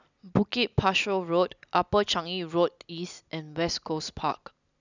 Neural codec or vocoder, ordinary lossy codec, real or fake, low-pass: none; none; real; 7.2 kHz